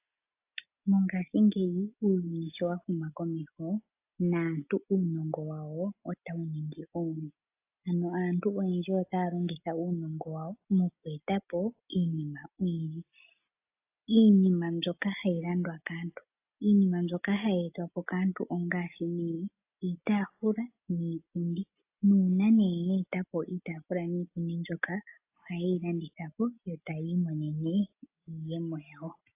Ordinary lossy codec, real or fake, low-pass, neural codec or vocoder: AAC, 32 kbps; real; 3.6 kHz; none